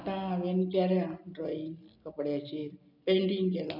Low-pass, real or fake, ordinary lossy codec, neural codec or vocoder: 5.4 kHz; real; none; none